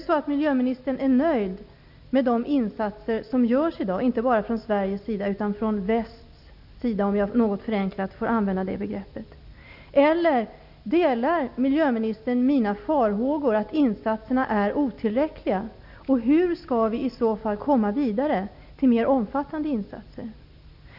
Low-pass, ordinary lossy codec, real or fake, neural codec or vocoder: 5.4 kHz; none; real; none